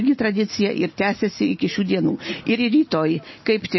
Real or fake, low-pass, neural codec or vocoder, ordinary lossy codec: real; 7.2 kHz; none; MP3, 24 kbps